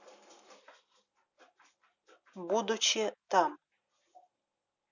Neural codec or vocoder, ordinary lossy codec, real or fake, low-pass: none; none; real; 7.2 kHz